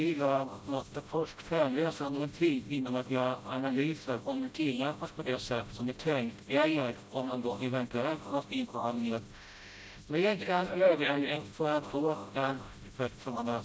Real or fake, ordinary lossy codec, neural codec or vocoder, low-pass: fake; none; codec, 16 kHz, 0.5 kbps, FreqCodec, smaller model; none